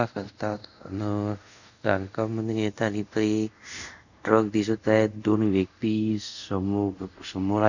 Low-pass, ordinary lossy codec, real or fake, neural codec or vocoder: 7.2 kHz; none; fake; codec, 24 kHz, 0.5 kbps, DualCodec